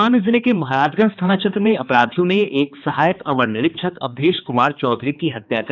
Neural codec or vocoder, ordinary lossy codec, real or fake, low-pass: codec, 16 kHz, 2 kbps, X-Codec, HuBERT features, trained on balanced general audio; none; fake; 7.2 kHz